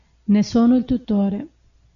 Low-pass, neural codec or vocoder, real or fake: 7.2 kHz; none; real